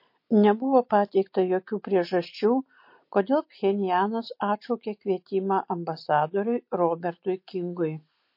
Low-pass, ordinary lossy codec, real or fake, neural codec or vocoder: 5.4 kHz; MP3, 32 kbps; real; none